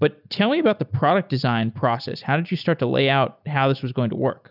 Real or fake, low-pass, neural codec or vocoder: real; 5.4 kHz; none